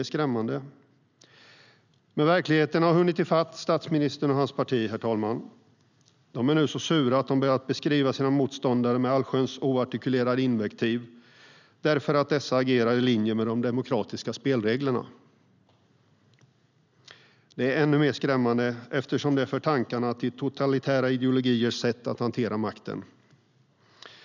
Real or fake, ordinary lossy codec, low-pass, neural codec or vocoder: real; none; 7.2 kHz; none